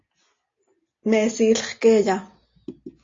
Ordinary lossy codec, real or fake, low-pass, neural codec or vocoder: AAC, 32 kbps; real; 7.2 kHz; none